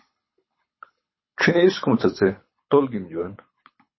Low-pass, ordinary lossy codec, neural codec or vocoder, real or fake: 7.2 kHz; MP3, 24 kbps; codec, 24 kHz, 6 kbps, HILCodec; fake